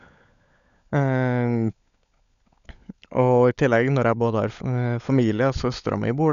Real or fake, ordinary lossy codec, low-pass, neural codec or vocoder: fake; none; 7.2 kHz; codec, 16 kHz, 16 kbps, FunCodec, trained on LibriTTS, 50 frames a second